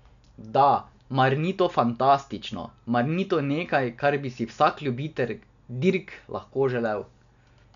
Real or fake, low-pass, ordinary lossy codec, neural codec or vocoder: real; 7.2 kHz; none; none